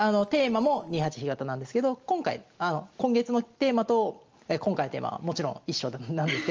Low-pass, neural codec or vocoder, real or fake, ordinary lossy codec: 7.2 kHz; none; real; Opus, 24 kbps